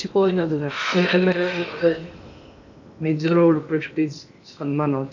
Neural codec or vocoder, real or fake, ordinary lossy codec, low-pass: codec, 16 kHz in and 24 kHz out, 0.8 kbps, FocalCodec, streaming, 65536 codes; fake; none; 7.2 kHz